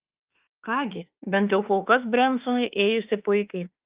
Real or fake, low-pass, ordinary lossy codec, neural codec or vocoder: fake; 3.6 kHz; Opus, 32 kbps; autoencoder, 48 kHz, 32 numbers a frame, DAC-VAE, trained on Japanese speech